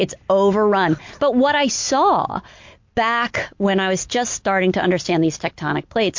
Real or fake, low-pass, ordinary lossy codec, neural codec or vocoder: real; 7.2 kHz; MP3, 48 kbps; none